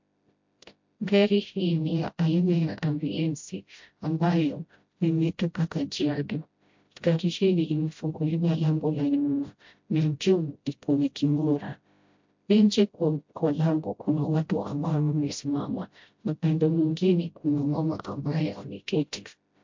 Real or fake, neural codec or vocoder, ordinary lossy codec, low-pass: fake; codec, 16 kHz, 0.5 kbps, FreqCodec, smaller model; MP3, 48 kbps; 7.2 kHz